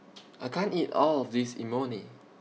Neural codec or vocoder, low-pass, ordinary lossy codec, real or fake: none; none; none; real